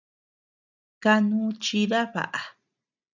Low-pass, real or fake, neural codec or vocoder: 7.2 kHz; real; none